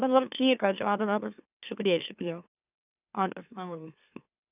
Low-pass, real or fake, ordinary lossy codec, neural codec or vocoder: 3.6 kHz; fake; none; autoencoder, 44.1 kHz, a latent of 192 numbers a frame, MeloTTS